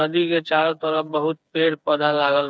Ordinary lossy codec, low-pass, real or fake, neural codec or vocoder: none; none; fake; codec, 16 kHz, 4 kbps, FreqCodec, smaller model